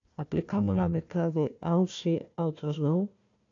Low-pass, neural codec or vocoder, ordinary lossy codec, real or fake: 7.2 kHz; codec, 16 kHz, 1 kbps, FunCodec, trained on Chinese and English, 50 frames a second; AAC, 48 kbps; fake